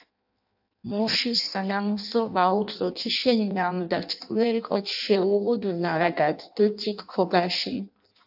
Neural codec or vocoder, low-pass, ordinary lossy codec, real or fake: codec, 16 kHz in and 24 kHz out, 0.6 kbps, FireRedTTS-2 codec; 5.4 kHz; AAC, 48 kbps; fake